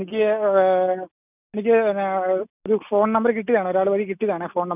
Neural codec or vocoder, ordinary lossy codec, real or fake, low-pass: none; none; real; 3.6 kHz